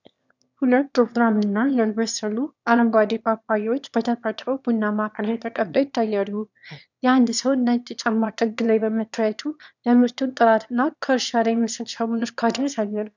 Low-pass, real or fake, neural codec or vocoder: 7.2 kHz; fake; autoencoder, 22.05 kHz, a latent of 192 numbers a frame, VITS, trained on one speaker